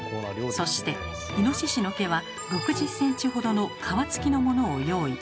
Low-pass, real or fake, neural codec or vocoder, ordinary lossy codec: none; real; none; none